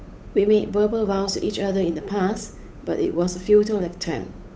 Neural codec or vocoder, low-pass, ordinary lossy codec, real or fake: codec, 16 kHz, 8 kbps, FunCodec, trained on Chinese and English, 25 frames a second; none; none; fake